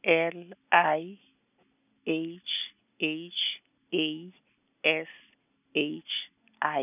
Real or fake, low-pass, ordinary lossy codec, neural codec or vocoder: real; 3.6 kHz; none; none